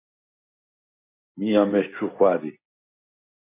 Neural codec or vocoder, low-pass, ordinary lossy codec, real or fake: none; 3.6 kHz; MP3, 24 kbps; real